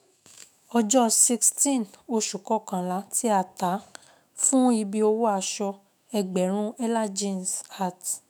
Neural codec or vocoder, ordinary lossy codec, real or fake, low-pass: autoencoder, 48 kHz, 128 numbers a frame, DAC-VAE, trained on Japanese speech; none; fake; none